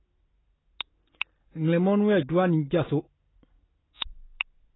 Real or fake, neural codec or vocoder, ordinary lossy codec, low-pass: real; none; AAC, 16 kbps; 7.2 kHz